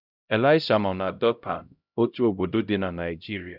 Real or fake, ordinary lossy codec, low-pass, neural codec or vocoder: fake; none; 5.4 kHz; codec, 16 kHz, 0.5 kbps, X-Codec, HuBERT features, trained on LibriSpeech